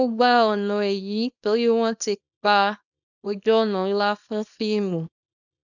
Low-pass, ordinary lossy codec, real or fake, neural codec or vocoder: 7.2 kHz; none; fake; codec, 24 kHz, 0.9 kbps, WavTokenizer, small release